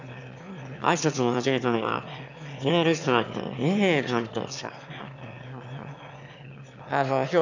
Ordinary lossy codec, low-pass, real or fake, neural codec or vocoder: none; 7.2 kHz; fake; autoencoder, 22.05 kHz, a latent of 192 numbers a frame, VITS, trained on one speaker